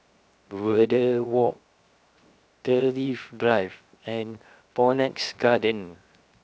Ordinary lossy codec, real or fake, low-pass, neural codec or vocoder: none; fake; none; codec, 16 kHz, 0.7 kbps, FocalCodec